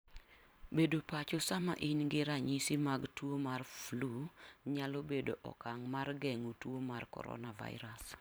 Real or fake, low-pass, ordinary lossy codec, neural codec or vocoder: fake; none; none; vocoder, 44.1 kHz, 128 mel bands every 512 samples, BigVGAN v2